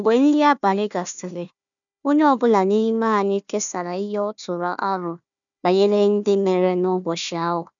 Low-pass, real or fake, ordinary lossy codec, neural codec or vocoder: 7.2 kHz; fake; none; codec, 16 kHz, 1 kbps, FunCodec, trained on Chinese and English, 50 frames a second